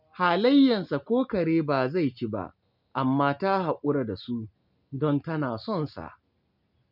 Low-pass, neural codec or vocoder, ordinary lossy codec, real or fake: 5.4 kHz; none; none; real